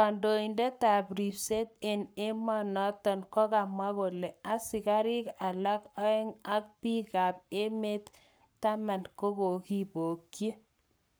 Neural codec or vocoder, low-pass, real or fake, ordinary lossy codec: codec, 44.1 kHz, 7.8 kbps, Pupu-Codec; none; fake; none